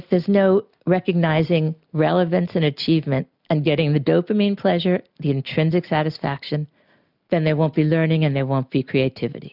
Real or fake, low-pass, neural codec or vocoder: real; 5.4 kHz; none